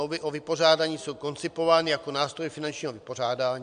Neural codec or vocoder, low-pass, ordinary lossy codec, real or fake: none; 9.9 kHz; MP3, 64 kbps; real